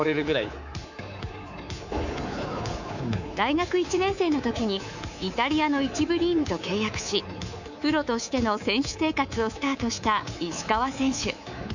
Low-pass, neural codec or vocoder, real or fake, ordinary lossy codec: 7.2 kHz; codec, 24 kHz, 3.1 kbps, DualCodec; fake; none